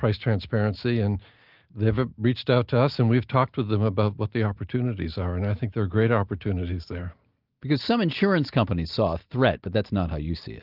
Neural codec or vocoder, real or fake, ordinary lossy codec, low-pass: none; real; Opus, 24 kbps; 5.4 kHz